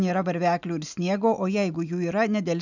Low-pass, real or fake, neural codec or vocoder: 7.2 kHz; real; none